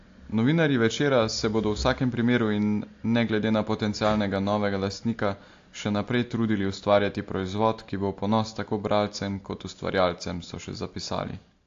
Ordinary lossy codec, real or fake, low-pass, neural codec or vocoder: AAC, 48 kbps; real; 7.2 kHz; none